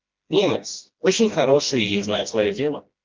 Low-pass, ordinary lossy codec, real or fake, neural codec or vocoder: 7.2 kHz; Opus, 32 kbps; fake; codec, 16 kHz, 1 kbps, FreqCodec, smaller model